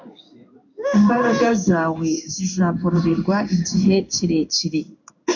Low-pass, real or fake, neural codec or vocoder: 7.2 kHz; fake; codec, 16 kHz in and 24 kHz out, 1 kbps, XY-Tokenizer